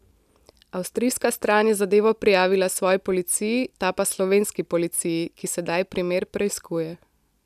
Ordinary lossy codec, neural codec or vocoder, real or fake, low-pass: none; none; real; 14.4 kHz